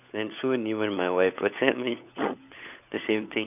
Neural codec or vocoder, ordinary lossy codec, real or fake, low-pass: codec, 16 kHz, 16 kbps, FunCodec, trained on LibriTTS, 50 frames a second; none; fake; 3.6 kHz